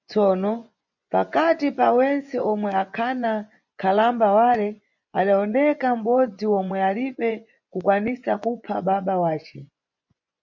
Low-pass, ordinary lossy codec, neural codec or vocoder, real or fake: 7.2 kHz; MP3, 64 kbps; vocoder, 24 kHz, 100 mel bands, Vocos; fake